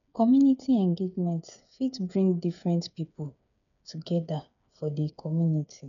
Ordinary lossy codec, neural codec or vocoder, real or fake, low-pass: none; codec, 16 kHz, 8 kbps, FreqCodec, smaller model; fake; 7.2 kHz